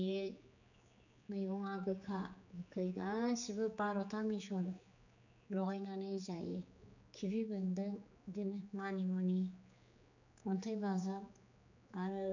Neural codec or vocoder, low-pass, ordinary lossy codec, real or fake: codec, 16 kHz, 4 kbps, X-Codec, HuBERT features, trained on general audio; 7.2 kHz; none; fake